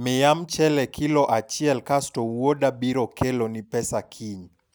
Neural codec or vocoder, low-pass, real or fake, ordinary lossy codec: none; none; real; none